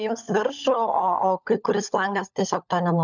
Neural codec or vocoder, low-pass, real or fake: codec, 16 kHz, 16 kbps, FunCodec, trained on LibriTTS, 50 frames a second; 7.2 kHz; fake